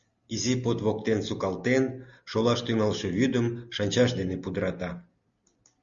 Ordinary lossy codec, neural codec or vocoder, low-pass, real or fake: Opus, 64 kbps; none; 7.2 kHz; real